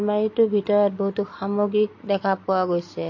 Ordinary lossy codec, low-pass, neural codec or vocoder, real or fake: MP3, 32 kbps; 7.2 kHz; none; real